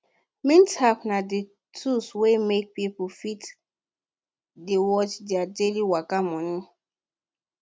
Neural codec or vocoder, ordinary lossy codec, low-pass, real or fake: none; none; none; real